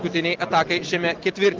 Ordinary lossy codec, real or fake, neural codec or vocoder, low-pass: Opus, 16 kbps; real; none; 7.2 kHz